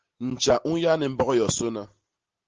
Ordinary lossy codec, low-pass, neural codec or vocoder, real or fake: Opus, 16 kbps; 7.2 kHz; none; real